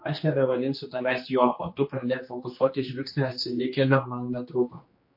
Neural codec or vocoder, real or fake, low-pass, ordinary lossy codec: codec, 16 kHz, 2 kbps, X-Codec, HuBERT features, trained on general audio; fake; 5.4 kHz; MP3, 32 kbps